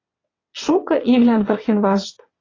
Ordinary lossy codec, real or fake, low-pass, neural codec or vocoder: AAC, 32 kbps; fake; 7.2 kHz; vocoder, 22.05 kHz, 80 mel bands, WaveNeXt